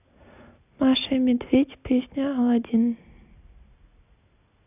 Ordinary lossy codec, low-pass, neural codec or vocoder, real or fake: none; 3.6 kHz; none; real